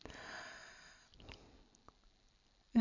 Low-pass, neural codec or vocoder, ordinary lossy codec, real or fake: 7.2 kHz; none; none; real